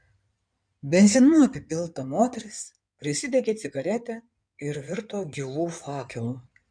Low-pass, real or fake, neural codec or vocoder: 9.9 kHz; fake; codec, 16 kHz in and 24 kHz out, 2.2 kbps, FireRedTTS-2 codec